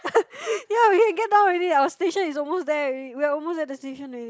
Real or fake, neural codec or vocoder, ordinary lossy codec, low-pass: real; none; none; none